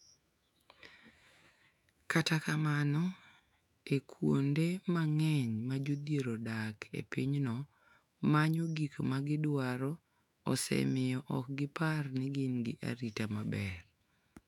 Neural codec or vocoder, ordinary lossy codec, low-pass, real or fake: autoencoder, 48 kHz, 128 numbers a frame, DAC-VAE, trained on Japanese speech; none; 19.8 kHz; fake